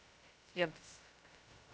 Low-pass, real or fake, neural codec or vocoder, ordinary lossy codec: none; fake; codec, 16 kHz, 0.2 kbps, FocalCodec; none